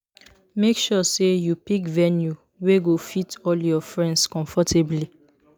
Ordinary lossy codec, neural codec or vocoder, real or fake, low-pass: none; none; real; none